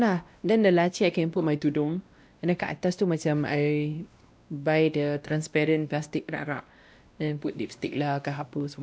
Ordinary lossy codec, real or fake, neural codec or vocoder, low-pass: none; fake; codec, 16 kHz, 1 kbps, X-Codec, WavLM features, trained on Multilingual LibriSpeech; none